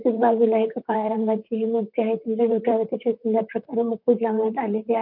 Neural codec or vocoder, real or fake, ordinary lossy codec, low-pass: vocoder, 44.1 kHz, 128 mel bands, Pupu-Vocoder; fake; none; 5.4 kHz